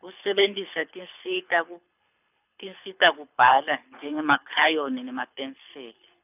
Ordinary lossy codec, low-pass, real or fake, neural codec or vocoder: none; 3.6 kHz; fake; codec, 24 kHz, 6 kbps, HILCodec